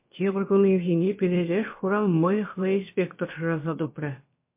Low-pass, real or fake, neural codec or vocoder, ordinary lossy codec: 3.6 kHz; fake; codec, 16 kHz, about 1 kbps, DyCAST, with the encoder's durations; MP3, 24 kbps